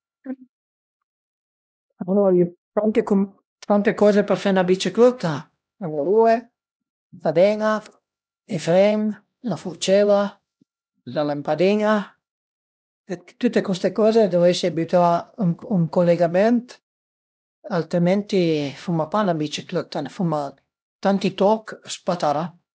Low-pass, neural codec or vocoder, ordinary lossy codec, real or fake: none; codec, 16 kHz, 1 kbps, X-Codec, HuBERT features, trained on LibriSpeech; none; fake